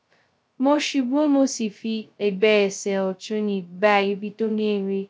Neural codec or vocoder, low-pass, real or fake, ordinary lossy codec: codec, 16 kHz, 0.2 kbps, FocalCodec; none; fake; none